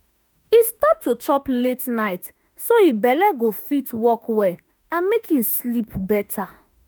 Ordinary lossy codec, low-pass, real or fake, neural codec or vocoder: none; none; fake; autoencoder, 48 kHz, 32 numbers a frame, DAC-VAE, trained on Japanese speech